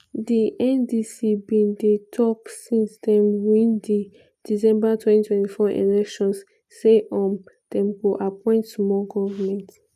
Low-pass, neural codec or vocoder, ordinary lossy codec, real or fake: 14.4 kHz; none; none; real